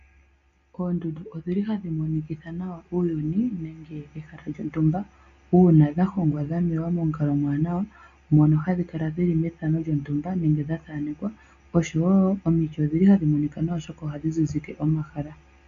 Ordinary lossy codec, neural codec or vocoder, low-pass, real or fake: MP3, 64 kbps; none; 7.2 kHz; real